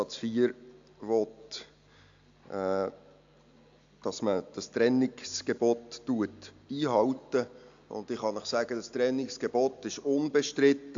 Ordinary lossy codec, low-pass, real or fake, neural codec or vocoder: AAC, 64 kbps; 7.2 kHz; real; none